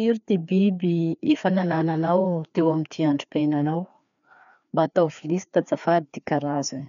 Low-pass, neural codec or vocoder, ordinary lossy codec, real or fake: 7.2 kHz; codec, 16 kHz, 2 kbps, FreqCodec, larger model; none; fake